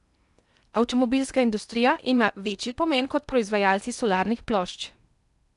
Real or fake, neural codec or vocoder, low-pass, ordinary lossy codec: fake; codec, 16 kHz in and 24 kHz out, 0.8 kbps, FocalCodec, streaming, 65536 codes; 10.8 kHz; none